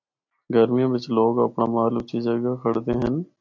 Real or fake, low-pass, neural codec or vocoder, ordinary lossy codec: real; 7.2 kHz; none; AAC, 48 kbps